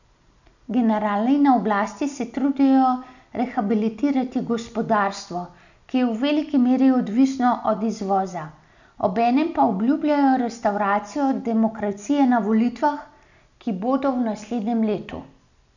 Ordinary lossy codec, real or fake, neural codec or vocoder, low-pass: none; real; none; 7.2 kHz